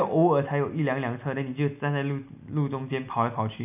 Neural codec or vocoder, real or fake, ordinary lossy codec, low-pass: none; real; none; 3.6 kHz